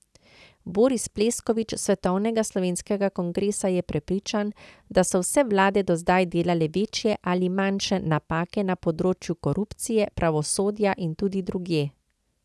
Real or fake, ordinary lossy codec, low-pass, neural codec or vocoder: real; none; none; none